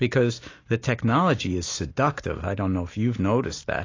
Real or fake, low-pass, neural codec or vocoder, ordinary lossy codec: real; 7.2 kHz; none; AAC, 32 kbps